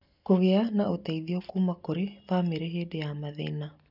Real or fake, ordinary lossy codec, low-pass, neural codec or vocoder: real; none; 5.4 kHz; none